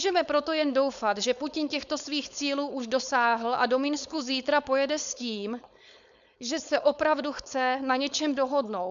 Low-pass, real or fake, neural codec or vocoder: 7.2 kHz; fake; codec, 16 kHz, 4.8 kbps, FACodec